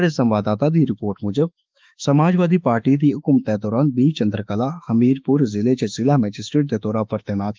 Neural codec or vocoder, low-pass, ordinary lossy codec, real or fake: autoencoder, 48 kHz, 32 numbers a frame, DAC-VAE, trained on Japanese speech; 7.2 kHz; Opus, 24 kbps; fake